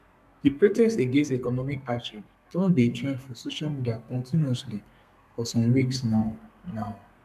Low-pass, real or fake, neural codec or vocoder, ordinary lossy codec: 14.4 kHz; fake; codec, 32 kHz, 1.9 kbps, SNAC; none